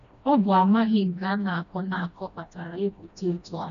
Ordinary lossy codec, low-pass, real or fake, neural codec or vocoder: none; 7.2 kHz; fake; codec, 16 kHz, 1 kbps, FreqCodec, smaller model